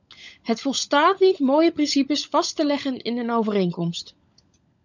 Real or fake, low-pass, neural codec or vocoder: fake; 7.2 kHz; codec, 16 kHz, 16 kbps, FunCodec, trained on LibriTTS, 50 frames a second